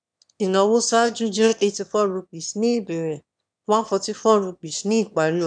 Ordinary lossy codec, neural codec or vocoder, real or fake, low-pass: none; autoencoder, 22.05 kHz, a latent of 192 numbers a frame, VITS, trained on one speaker; fake; 9.9 kHz